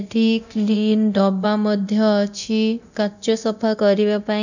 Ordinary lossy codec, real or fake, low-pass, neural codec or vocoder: none; fake; 7.2 kHz; codec, 24 kHz, 0.9 kbps, DualCodec